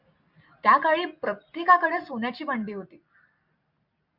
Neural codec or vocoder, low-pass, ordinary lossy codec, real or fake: none; 5.4 kHz; Opus, 64 kbps; real